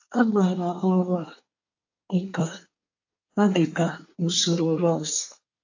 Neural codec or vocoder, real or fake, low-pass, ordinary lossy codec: codec, 24 kHz, 1 kbps, SNAC; fake; 7.2 kHz; AAC, 48 kbps